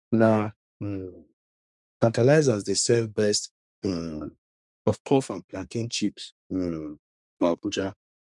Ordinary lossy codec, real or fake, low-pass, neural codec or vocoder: none; fake; 10.8 kHz; codec, 24 kHz, 1 kbps, SNAC